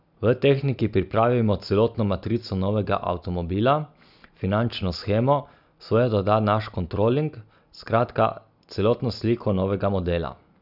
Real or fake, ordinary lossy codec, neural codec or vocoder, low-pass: real; none; none; 5.4 kHz